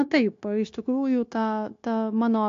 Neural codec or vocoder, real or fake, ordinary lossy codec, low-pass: codec, 16 kHz, 0.9 kbps, LongCat-Audio-Codec; fake; AAC, 48 kbps; 7.2 kHz